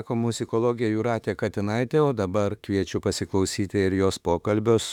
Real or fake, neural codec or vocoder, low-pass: fake; autoencoder, 48 kHz, 32 numbers a frame, DAC-VAE, trained on Japanese speech; 19.8 kHz